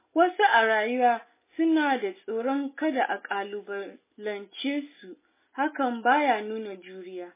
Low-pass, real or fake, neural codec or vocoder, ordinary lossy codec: 3.6 kHz; real; none; MP3, 16 kbps